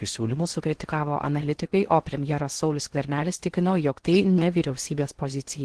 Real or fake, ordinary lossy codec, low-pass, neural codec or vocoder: fake; Opus, 16 kbps; 10.8 kHz; codec, 16 kHz in and 24 kHz out, 0.6 kbps, FocalCodec, streaming, 4096 codes